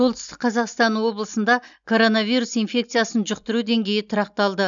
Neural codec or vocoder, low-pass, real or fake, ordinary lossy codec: none; 7.2 kHz; real; none